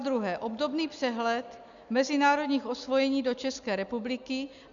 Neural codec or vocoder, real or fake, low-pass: none; real; 7.2 kHz